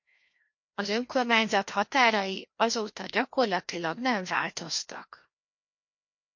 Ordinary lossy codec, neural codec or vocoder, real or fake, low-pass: MP3, 48 kbps; codec, 16 kHz, 1 kbps, FreqCodec, larger model; fake; 7.2 kHz